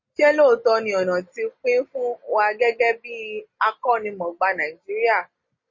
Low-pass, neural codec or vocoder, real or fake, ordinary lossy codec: 7.2 kHz; none; real; MP3, 32 kbps